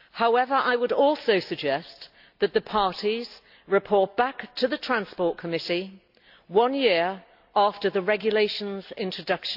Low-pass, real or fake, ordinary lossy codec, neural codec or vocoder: 5.4 kHz; real; AAC, 48 kbps; none